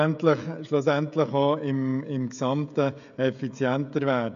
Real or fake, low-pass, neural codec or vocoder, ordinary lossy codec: fake; 7.2 kHz; codec, 16 kHz, 16 kbps, FreqCodec, smaller model; none